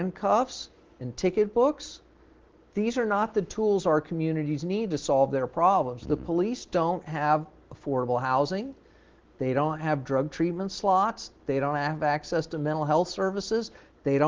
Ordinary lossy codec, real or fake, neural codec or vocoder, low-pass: Opus, 16 kbps; real; none; 7.2 kHz